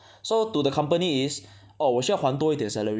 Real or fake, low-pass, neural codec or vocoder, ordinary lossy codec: real; none; none; none